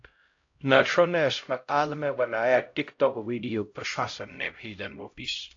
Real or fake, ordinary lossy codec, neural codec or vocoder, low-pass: fake; AAC, 48 kbps; codec, 16 kHz, 0.5 kbps, X-Codec, HuBERT features, trained on LibriSpeech; 7.2 kHz